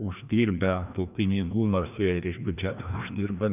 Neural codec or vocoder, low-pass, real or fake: codec, 16 kHz, 1 kbps, FreqCodec, larger model; 3.6 kHz; fake